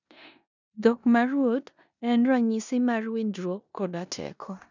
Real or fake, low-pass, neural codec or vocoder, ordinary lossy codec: fake; 7.2 kHz; codec, 16 kHz in and 24 kHz out, 0.9 kbps, LongCat-Audio-Codec, four codebook decoder; none